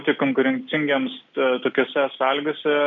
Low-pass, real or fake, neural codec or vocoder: 7.2 kHz; real; none